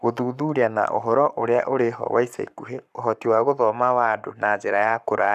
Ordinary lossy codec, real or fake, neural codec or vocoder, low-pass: none; fake; codec, 44.1 kHz, 7.8 kbps, Pupu-Codec; 14.4 kHz